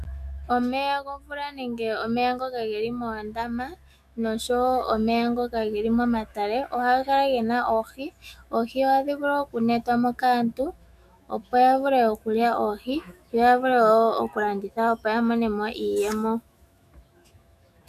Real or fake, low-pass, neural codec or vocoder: fake; 14.4 kHz; autoencoder, 48 kHz, 128 numbers a frame, DAC-VAE, trained on Japanese speech